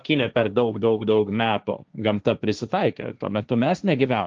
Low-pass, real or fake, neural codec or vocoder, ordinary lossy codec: 7.2 kHz; fake; codec, 16 kHz, 1.1 kbps, Voila-Tokenizer; Opus, 24 kbps